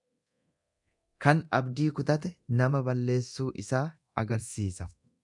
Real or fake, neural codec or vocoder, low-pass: fake; codec, 24 kHz, 0.9 kbps, DualCodec; 10.8 kHz